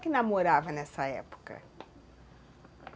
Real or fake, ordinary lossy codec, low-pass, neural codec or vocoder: real; none; none; none